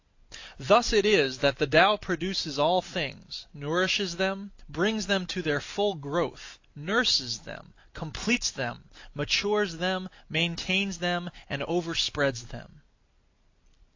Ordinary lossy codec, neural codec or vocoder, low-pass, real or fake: AAC, 48 kbps; none; 7.2 kHz; real